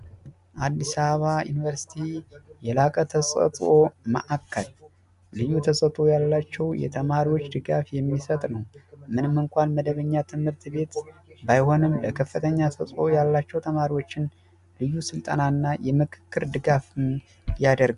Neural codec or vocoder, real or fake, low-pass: none; real; 10.8 kHz